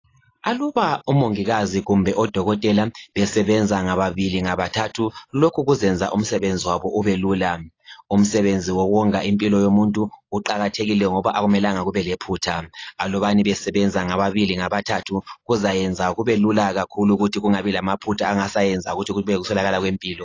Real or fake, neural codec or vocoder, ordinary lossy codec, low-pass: real; none; AAC, 32 kbps; 7.2 kHz